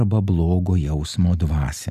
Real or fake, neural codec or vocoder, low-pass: real; none; 14.4 kHz